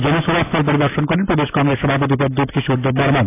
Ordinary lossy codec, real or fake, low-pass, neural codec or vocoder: AAC, 24 kbps; real; 3.6 kHz; none